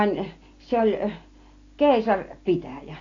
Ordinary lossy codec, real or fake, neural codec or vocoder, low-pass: AAC, 32 kbps; real; none; 7.2 kHz